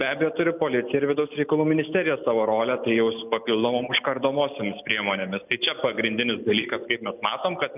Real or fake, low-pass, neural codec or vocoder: real; 3.6 kHz; none